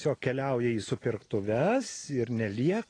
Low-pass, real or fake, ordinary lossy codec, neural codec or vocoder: 9.9 kHz; real; AAC, 32 kbps; none